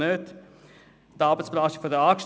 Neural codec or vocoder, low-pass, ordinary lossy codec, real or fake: none; none; none; real